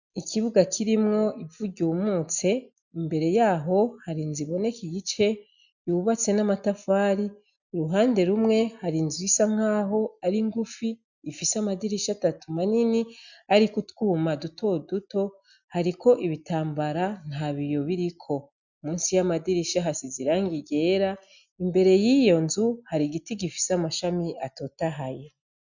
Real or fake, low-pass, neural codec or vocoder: real; 7.2 kHz; none